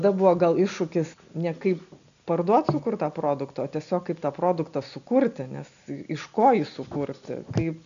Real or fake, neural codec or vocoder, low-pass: real; none; 7.2 kHz